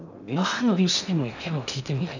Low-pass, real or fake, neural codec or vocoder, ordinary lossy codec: 7.2 kHz; fake; codec, 16 kHz in and 24 kHz out, 0.6 kbps, FocalCodec, streaming, 2048 codes; none